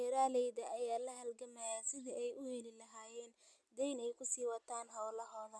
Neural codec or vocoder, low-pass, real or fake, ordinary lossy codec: none; none; real; none